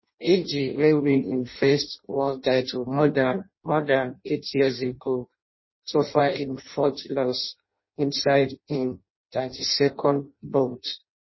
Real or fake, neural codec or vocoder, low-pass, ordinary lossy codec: fake; codec, 16 kHz in and 24 kHz out, 0.6 kbps, FireRedTTS-2 codec; 7.2 kHz; MP3, 24 kbps